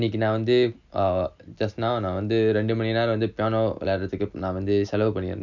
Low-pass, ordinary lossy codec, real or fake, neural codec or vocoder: 7.2 kHz; none; real; none